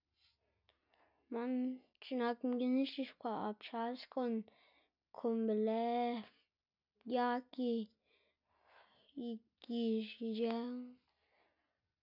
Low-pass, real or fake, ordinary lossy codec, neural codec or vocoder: 5.4 kHz; real; none; none